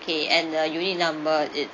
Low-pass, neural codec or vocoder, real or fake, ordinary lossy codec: 7.2 kHz; none; real; AAC, 32 kbps